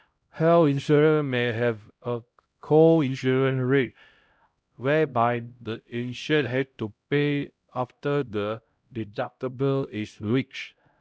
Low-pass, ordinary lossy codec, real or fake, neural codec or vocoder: none; none; fake; codec, 16 kHz, 0.5 kbps, X-Codec, HuBERT features, trained on LibriSpeech